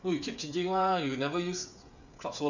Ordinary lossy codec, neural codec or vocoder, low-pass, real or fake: none; codec, 16 kHz, 8 kbps, FreqCodec, smaller model; 7.2 kHz; fake